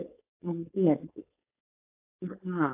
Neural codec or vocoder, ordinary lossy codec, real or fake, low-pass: codec, 16 kHz, 4.8 kbps, FACodec; AAC, 32 kbps; fake; 3.6 kHz